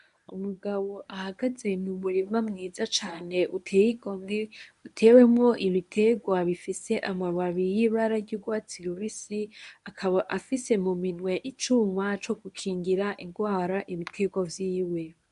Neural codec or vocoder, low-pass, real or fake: codec, 24 kHz, 0.9 kbps, WavTokenizer, medium speech release version 1; 10.8 kHz; fake